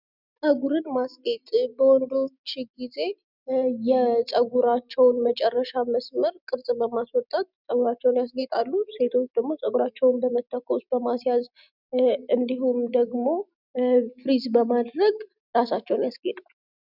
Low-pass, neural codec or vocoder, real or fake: 5.4 kHz; none; real